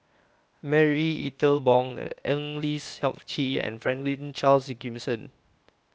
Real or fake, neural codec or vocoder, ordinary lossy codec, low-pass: fake; codec, 16 kHz, 0.8 kbps, ZipCodec; none; none